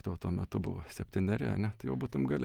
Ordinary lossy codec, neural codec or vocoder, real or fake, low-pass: Opus, 24 kbps; none; real; 19.8 kHz